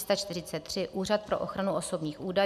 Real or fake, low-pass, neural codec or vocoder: real; 14.4 kHz; none